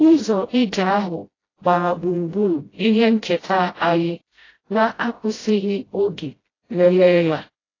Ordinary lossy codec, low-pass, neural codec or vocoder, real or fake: AAC, 32 kbps; 7.2 kHz; codec, 16 kHz, 0.5 kbps, FreqCodec, smaller model; fake